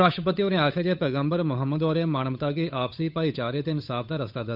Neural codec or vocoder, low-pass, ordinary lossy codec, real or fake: codec, 16 kHz, 8 kbps, FunCodec, trained on Chinese and English, 25 frames a second; 5.4 kHz; none; fake